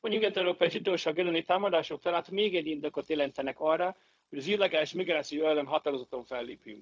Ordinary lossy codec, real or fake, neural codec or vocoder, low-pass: none; fake; codec, 16 kHz, 0.4 kbps, LongCat-Audio-Codec; none